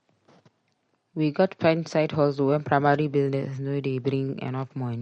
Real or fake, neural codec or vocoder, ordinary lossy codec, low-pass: real; none; MP3, 48 kbps; 9.9 kHz